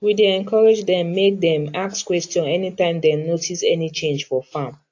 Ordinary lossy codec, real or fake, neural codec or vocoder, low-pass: AAC, 48 kbps; real; none; 7.2 kHz